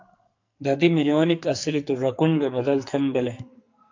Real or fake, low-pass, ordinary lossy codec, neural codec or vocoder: fake; 7.2 kHz; AAC, 48 kbps; codec, 44.1 kHz, 2.6 kbps, SNAC